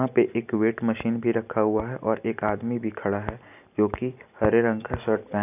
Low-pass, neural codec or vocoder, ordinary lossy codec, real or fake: 3.6 kHz; none; none; real